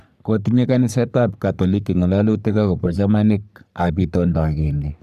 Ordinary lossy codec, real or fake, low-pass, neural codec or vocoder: none; fake; 14.4 kHz; codec, 44.1 kHz, 3.4 kbps, Pupu-Codec